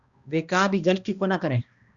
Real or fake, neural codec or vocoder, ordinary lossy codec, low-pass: fake; codec, 16 kHz, 1 kbps, X-Codec, HuBERT features, trained on balanced general audio; Opus, 64 kbps; 7.2 kHz